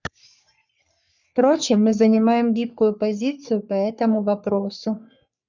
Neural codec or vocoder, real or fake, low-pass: codec, 44.1 kHz, 3.4 kbps, Pupu-Codec; fake; 7.2 kHz